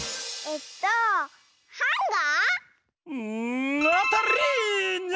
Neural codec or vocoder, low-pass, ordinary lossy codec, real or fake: none; none; none; real